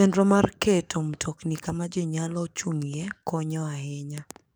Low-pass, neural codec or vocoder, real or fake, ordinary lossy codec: none; codec, 44.1 kHz, 7.8 kbps, DAC; fake; none